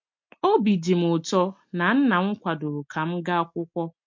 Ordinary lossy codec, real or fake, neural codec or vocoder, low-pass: MP3, 48 kbps; real; none; 7.2 kHz